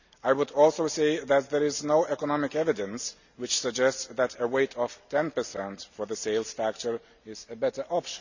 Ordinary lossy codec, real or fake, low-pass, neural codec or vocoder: none; real; 7.2 kHz; none